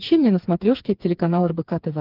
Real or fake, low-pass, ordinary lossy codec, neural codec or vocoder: fake; 5.4 kHz; Opus, 32 kbps; codec, 16 kHz, 4 kbps, FreqCodec, smaller model